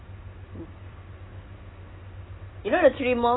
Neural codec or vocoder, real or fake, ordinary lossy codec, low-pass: none; real; AAC, 16 kbps; 7.2 kHz